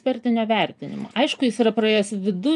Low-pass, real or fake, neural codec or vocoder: 10.8 kHz; fake; vocoder, 24 kHz, 100 mel bands, Vocos